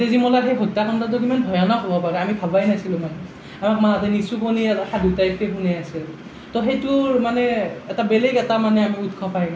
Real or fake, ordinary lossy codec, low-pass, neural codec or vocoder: real; none; none; none